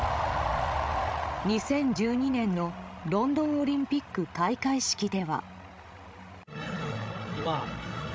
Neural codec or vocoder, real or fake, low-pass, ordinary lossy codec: codec, 16 kHz, 16 kbps, FreqCodec, larger model; fake; none; none